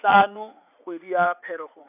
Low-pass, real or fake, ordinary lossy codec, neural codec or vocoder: 3.6 kHz; fake; none; vocoder, 44.1 kHz, 80 mel bands, Vocos